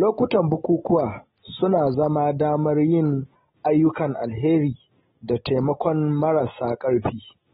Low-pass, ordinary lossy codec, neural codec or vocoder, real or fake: 14.4 kHz; AAC, 16 kbps; none; real